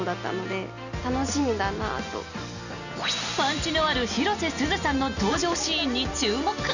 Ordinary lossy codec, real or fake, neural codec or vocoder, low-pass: none; real; none; 7.2 kHz